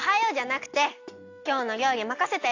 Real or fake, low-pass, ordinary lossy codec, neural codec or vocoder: real; 7.2 kHz; AAC, 48 kbps; none